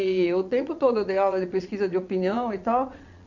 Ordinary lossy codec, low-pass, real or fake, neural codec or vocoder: none; 7.2 kHz; fake; vocoder, 22.05 kHz, 80 mel bands, Vocos